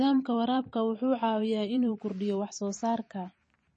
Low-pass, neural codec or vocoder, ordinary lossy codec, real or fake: 10.8 kHz; vocoder, 44.1 kHz, 128 mel bands every 512 samples, BigVGAN v2; MP3, 32 kbps; fake